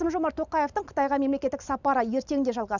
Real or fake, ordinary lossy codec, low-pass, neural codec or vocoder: real; none; 7.2 kHz; none